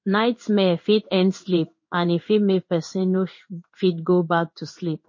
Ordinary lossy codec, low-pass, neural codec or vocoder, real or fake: MP3, 32 kbps; 7.2 kHz; codec, 16 kHz in and 24 kHz out, 1 kbps, XY-Tokenizer; fake